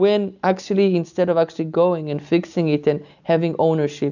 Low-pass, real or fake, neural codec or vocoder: 7.2 kHz; real; none